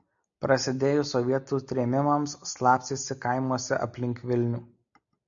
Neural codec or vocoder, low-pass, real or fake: none; 7.2 kHz; real